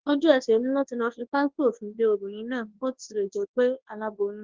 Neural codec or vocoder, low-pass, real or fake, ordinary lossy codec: codec, 24 kHz, 0.9 kbps, WavTokenizer, large speech release; 7.2 kHz; fake; Opus, 16 kbps